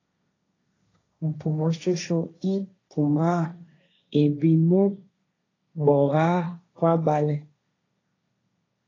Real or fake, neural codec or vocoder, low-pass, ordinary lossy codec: fake; codec, 16 kHz, 1.1 kbps, Voila-Tokenizer; 7.2 kHz; AAC, 32 kbps